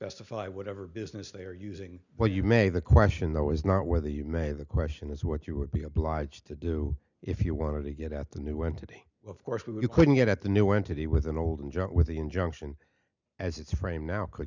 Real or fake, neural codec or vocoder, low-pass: real; none; 7.2 kHz